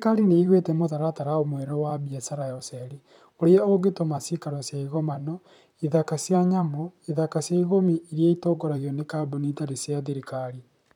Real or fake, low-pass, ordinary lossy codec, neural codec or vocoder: fake; 19.8 kHz; none; vocoder, 44.1 kHz, 128 mel bands, Pupu-Vocoder